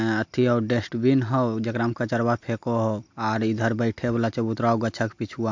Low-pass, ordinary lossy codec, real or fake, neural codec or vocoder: 7.2 kHz; MP3, 48 kbps; real; none